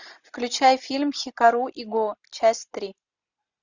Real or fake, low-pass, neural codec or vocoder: real; 7.2 kHz; none